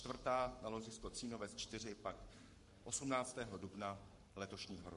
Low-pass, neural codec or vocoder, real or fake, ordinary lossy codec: 14.4 kHz; codec, 44.1 kHz, 7.8 kbps, Pupu-Codec; fake; MP3, 48 kbps